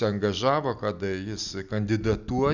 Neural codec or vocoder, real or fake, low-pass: none; real; 7.2 kHz